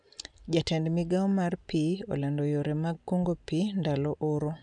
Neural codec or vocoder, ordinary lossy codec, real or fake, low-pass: none; none; real; 9.9 kHz